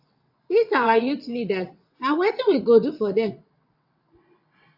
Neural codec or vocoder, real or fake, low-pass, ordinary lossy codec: vocoder, 22.05 kHz, 80 mel bands, WaveNeXt; fake; 5.4 kHz; AAC, 48 kbps